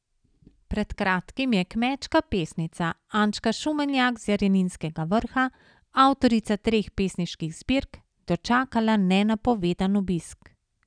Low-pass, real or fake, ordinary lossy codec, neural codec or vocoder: 9.9 kHz; real; none; none